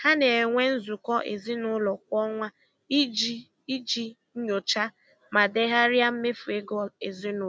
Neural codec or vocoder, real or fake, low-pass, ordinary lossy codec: none; real; none; none